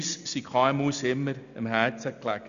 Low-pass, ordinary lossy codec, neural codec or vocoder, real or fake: 7.2 kHz; none; none; real